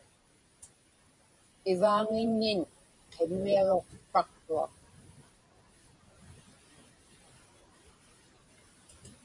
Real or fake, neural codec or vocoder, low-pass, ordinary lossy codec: fake; vocoder, 44.1 kHz, 128 mel bands every 512 samples, BigVGAN v2; 10.8 kHz; MP3, 48 kbps